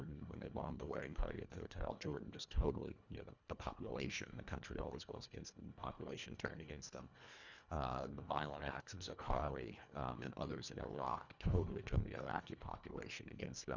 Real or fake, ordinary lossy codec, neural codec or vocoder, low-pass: fake; Opus, 64 kbps; codec, 24 kHz, 1.5 kbps, HILCodec; 7.2 kHz